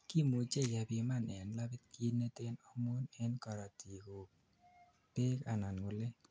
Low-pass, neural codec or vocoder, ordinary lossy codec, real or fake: none; none; none; real